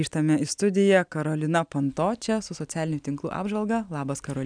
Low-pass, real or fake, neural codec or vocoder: 9.9 kHz; real; none